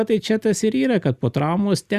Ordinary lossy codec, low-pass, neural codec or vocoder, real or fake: Opus, 64 kbps; 14.4 kHz; none; real